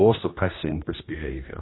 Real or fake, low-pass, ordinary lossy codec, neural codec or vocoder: fake; 7.2 kHz; AAC, 16 kbps; codec, 16 kHz, 1 kbps, X-Codec, HuBERT features, trained on general audio